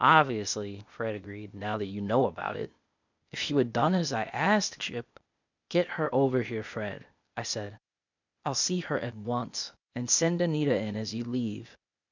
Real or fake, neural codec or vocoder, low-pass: fake; codec, 16 kHz, 0.8 kbps, ZipCodec; 7.2 kHz